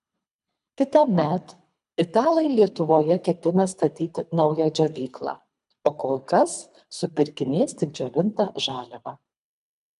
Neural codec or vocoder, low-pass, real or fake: codec, 24 kHz, 3 kbps, HILCodec; 10.8 kHz; fake